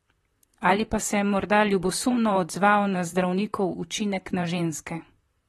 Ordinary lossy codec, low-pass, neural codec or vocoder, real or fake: AAC, 32 kbps; 19.8 kHz; vocoder, 44.1 kHz, 128 mel bands, Pupu-Vocoder; fake